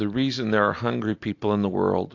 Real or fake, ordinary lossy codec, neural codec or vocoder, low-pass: real; AAC, 48 kbps; none; 7.2 kHz